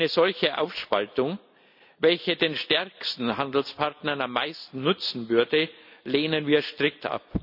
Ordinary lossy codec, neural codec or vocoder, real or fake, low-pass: none; none; real; 5.4 kHz